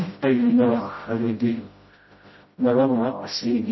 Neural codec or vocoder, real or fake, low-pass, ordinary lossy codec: codec, 16 kHz, 0.5 kbps, FreqCodec, smaller model; fake; 7.2 kHz; MP3, 24 kbps